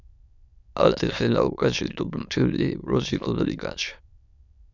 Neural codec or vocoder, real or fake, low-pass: autoencoder, 22.05 kHz, a latent of 192 numbers a frame, VITS, trained on many speakers; fake; 7.2 kHz